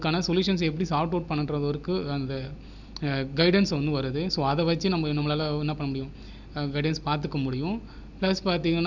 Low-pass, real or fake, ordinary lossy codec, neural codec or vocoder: 7.2 kHz; real; none; none